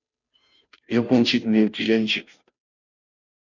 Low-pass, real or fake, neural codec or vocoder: 7.2 kHz; fake; codec, 16 kHz, 0.5 kbps, FunCodec, trained on Chinese and English, 25 frames a second